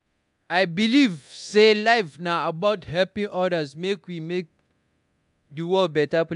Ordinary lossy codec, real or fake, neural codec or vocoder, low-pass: AAC, 96 kbps; fake; codec, 24 kHz, 0.9 kbps, DualCodec; 10.8 kHz